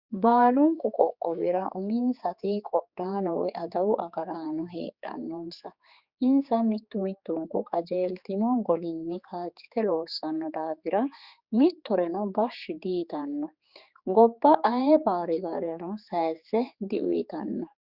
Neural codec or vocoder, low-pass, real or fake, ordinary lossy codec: codec, 16 kHz, 4 kbps, X-Codec, HuBERT features, trained on general audio; 5.4 kHz; fake; Opus, 64 kbps